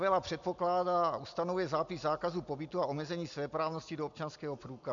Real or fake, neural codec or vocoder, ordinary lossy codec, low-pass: real; none; MP3, 64 kbps; 7.2 kHz